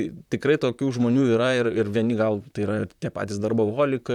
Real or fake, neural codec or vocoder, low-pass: fake; vocoder, 44.1 kHz, 128 mel bands, Pupu-Vocoder; 19.8 kHz